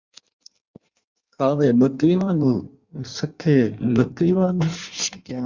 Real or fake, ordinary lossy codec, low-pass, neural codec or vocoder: fake; Opus, 64 kbps; 7.2 kHz; codec, 16 kHz in and 24 kHz out, 1.1 kbps, FireRedTTS-2 codec